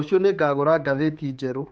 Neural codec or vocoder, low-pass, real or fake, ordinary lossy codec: codec, 16 kHz, 4 kbps, X-Codec, HuBERT features, trained on general audio; none; fake; none